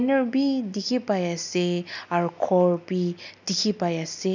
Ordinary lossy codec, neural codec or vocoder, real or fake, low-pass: none; none; real; 7.2 kHz